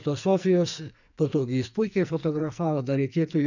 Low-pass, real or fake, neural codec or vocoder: 7.2 kHz; fake; codec, 44.1 kHz, 2.6 kbps, SNAC